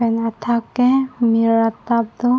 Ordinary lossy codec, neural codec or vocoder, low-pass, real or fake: none; none; none; real